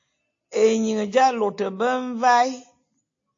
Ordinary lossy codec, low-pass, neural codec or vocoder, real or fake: AAC, 48 kbps; 7.2 kHz; none; real